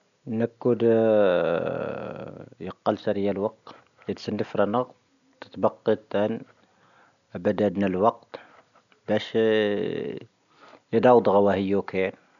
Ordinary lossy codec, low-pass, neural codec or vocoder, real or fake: none; 7.2 kHz; none; real